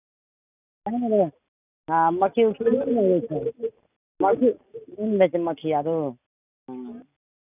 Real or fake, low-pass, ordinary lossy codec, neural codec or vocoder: real; 3.6 kHz; none; none